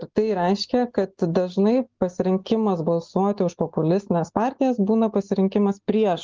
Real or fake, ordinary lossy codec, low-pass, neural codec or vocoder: real; Opus, 32 kbps; 7.2 kHz; none